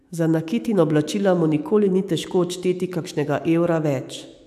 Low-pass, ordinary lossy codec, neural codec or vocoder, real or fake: 14.4 kHz; AAC, 96 kbps; autoencoder, 48 kHz, 128 numbers a frame, DAC-VAE, trained on Japanese speech; fake